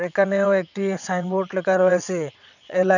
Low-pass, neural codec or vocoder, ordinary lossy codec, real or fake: 7.2 kHz; vocoder, 22.05 kHz, 80 mel bands, WaveNeXt; none; fake